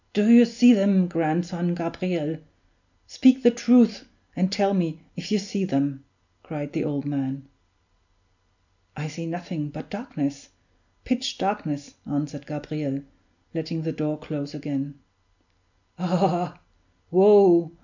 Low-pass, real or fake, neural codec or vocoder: 7.2 kHz; real; none